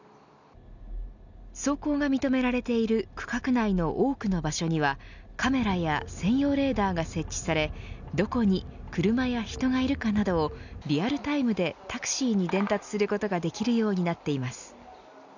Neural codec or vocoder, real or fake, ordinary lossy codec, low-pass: none; real; none; 7.2 kHz